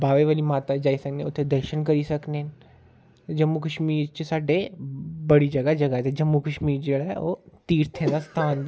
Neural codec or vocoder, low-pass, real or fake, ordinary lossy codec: none; none; real; none